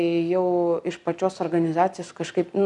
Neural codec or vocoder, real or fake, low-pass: none; real; 10.8 kHz